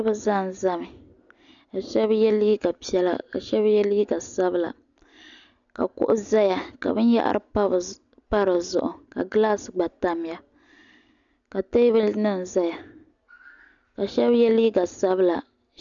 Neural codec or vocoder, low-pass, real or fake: none; 7.2 kHz; real